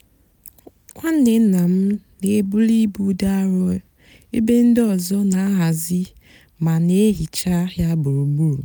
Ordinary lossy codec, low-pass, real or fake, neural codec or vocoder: none; none; real; none